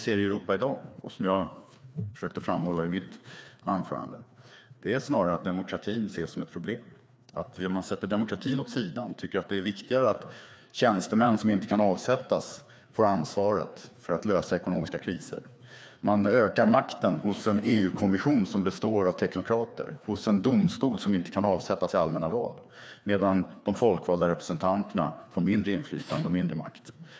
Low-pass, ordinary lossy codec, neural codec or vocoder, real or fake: none; none; codec, 16 kHz, 2 kbps, FreqCodec, larger model; fake